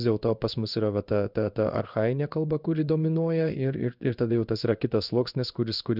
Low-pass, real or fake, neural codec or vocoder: 5.4 kHz; fake; codec, 16 kHz in and 24 kHz out, 1 kbps, XY-Tokenizer